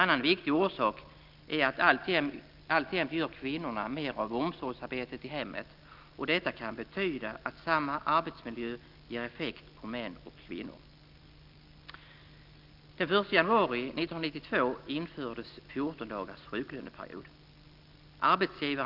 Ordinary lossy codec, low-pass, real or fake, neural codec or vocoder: Opus, 32 kbps; 5.4 kHz; real; none